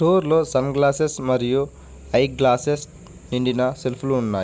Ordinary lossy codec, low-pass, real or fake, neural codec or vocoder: none; none; real; none